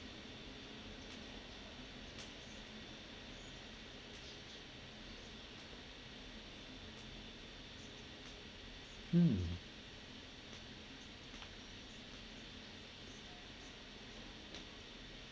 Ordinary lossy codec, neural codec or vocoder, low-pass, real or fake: none; none; none; real